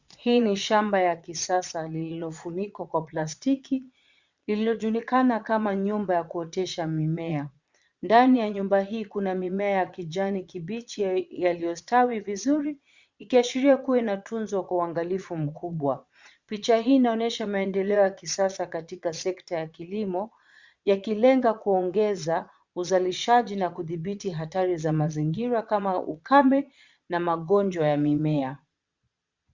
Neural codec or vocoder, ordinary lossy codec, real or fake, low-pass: vocoder, 22.05 kHz, 80 mel bands, Vocos; Opus, 64 kbps; fake; 7.2 kHz